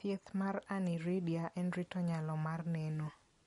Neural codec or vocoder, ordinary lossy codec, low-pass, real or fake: none; MP3, 48 kbps; 19.8 kHz; real